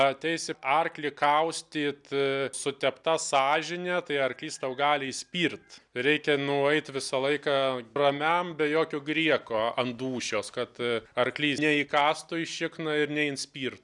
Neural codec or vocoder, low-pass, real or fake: none; 10.8 kHz; real